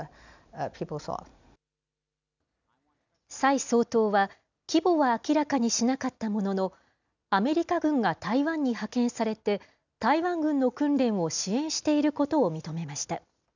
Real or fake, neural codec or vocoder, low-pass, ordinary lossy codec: real; none; 7.2 kHz; none